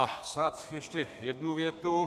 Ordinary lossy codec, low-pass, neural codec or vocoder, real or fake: AAC, 96 kbps; 14.4 kHz; codec, 32 kHz, 1.9 kbps, SNAC; fake